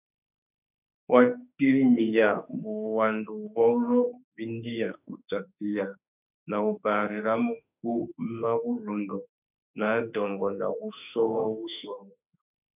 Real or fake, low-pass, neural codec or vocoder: fake; 3.6 kHz; autoencoder, 48 kHz, 32 numbers a frame, DAC-VAE, trained on Japanese speech